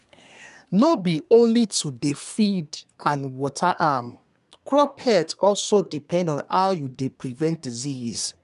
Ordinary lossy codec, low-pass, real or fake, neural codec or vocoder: none; 10.8 kHz; fake; codec, 24 kHz, 1 kbps, SNAC